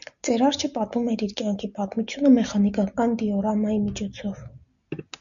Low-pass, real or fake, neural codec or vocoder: 7.2 kHz; real; none